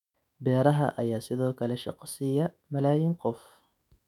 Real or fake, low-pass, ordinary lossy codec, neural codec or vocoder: fake; 19.8 kHz; none; autoencoder, 48 kHz, 128 numbers a frame, DAC-VAE, trained on Japanese speech